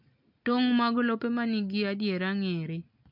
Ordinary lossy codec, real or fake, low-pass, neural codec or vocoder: MP3, 48 kbps; real; 5.4 kHz; none